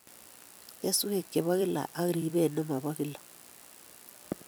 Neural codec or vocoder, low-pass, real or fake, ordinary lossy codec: vocoder, 44.1 kHz, 128 mel bands every 256 samples, BigVGAN v2; none; fake; none